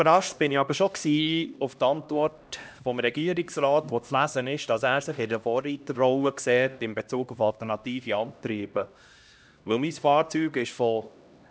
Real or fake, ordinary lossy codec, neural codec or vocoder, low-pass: fake; none; codec, 16 kHz, 1 kbps, X-Codec, HuBERT features, trained on LibriSpeech; none